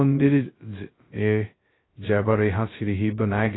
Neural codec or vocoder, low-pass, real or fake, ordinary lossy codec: codec, 16 kHz, 0.2 kbps, FocalCodec; 7.2 kHz; fake; AAC, 16 kbps